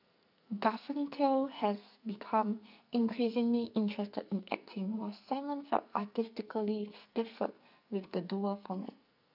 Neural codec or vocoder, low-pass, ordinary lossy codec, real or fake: codec, 44.1 kHz, 2.6 kbps, SNAC; 5.4 kHz; none; fake